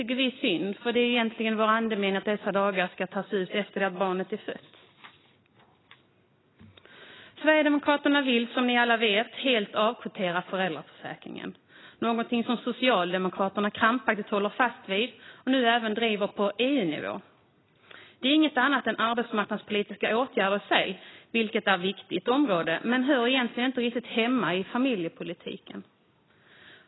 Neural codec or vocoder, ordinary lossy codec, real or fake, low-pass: none; AAC, 16 kbps; real; 7.2 kHz